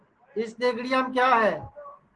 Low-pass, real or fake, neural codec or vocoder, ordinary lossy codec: 10.8 kHz; real; none; Opus, 16 kbps